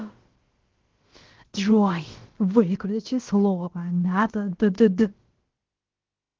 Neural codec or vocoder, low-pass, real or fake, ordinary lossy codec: codec, 16 kHz, about 1 kbps, DyCAST, with the encoder's durations; 7.2 kHz; fake; Opus, 16 kbps